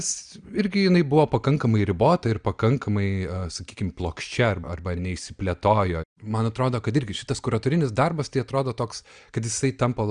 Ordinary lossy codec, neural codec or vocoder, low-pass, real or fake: Opus, 64 kbps; none; 9.9 kHz; real